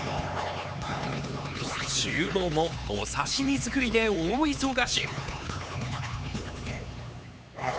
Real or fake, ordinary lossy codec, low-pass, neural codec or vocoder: fake; none; none; codec, 16 kHz, 4 kbps, X-Codec, HuBERT features, trained on LibriSpeech